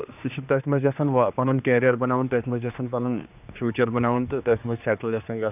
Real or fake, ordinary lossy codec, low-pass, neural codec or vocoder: fake; none; 3.6 kHz; codec, 16 kHz, 2 kbps, X-Codec, HuBERT features, trained on general audio